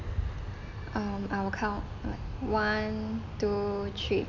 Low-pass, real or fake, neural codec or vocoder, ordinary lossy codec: 7.2 kHz; real; none; none